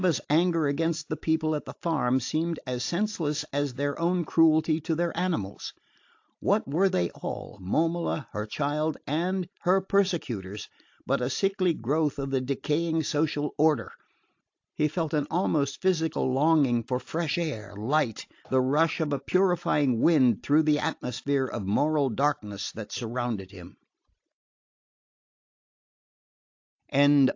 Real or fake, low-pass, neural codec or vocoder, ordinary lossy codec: real; 7.2 kHz; none; AAC, 48 kbps